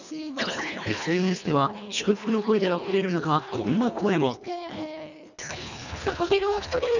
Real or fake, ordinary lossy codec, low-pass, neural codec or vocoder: fake; none; 7.2 kHz; codec, 24 kHz, 1.5 kbps, HILCodec